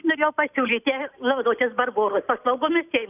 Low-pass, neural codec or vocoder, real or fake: 3.6 kHz; none; real